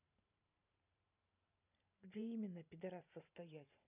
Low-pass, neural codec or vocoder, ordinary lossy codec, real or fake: 3.6 kHz; vocoder, 44.1 kHz, 128 mel bands every 512 samples, BigVGAN v2; none; fake